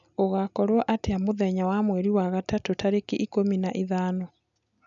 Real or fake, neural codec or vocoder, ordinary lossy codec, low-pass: real; none; none; 7.2 kHz